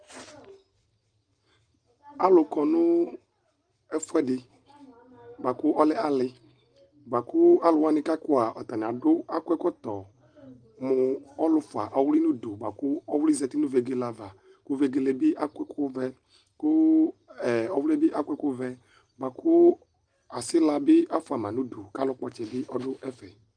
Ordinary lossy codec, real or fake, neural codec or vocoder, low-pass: Opus, 32 kbps; real; none; 9.9 kHz